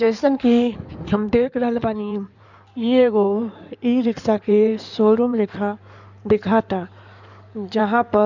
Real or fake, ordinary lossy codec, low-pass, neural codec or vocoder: fake; none; 7.2 kHz; codec, 16 kHz in and 24 kHz out, 2.2 kbps, FireRedTTS-2 codec